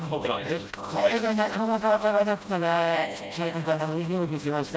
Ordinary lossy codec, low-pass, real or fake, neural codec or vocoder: none; none; fake; codec, 16 kHz, 0.5 kbps, FreqCodec, smaller model